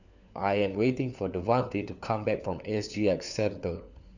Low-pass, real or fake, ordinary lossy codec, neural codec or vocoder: 7.2 kHz; fake; none; codec, 16 kHz, 4 kbps, FunCodec, trained on LibriTTS, 50 frames a second